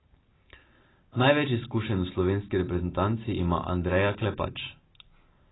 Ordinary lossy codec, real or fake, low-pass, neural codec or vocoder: AAC, 16 kbps; real; 7.2 kHz; none